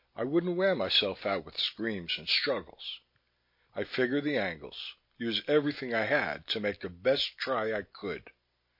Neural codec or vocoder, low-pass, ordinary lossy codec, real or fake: none; 5.4 kHz; MP3, 32 kbps; real